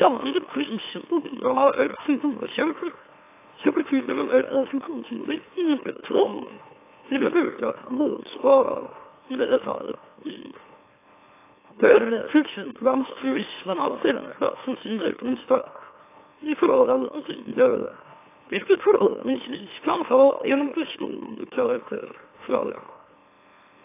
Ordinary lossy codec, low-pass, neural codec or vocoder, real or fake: AAC, 24 kbps; 3.6 kHz; autoencoder, 44.1 kHz, a latent of 192 numbers a frame, MeloTTS; fake